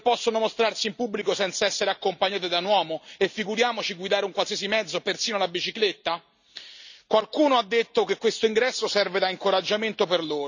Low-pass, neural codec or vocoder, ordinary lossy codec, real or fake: 7.2 kHz; none; none; real